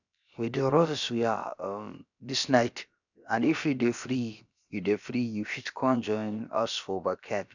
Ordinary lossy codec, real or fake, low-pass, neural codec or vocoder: none; fake; 7.2 kHz; codec, 16 kHz, about 1 kbps, DyCAST, with the encoder's durations